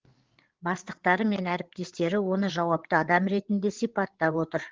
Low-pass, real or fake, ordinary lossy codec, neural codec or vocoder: 7.2 kHz; fake; Opus, 16 kbps; codec, 16 kHz, 8 kbps, FreqCodec, larger model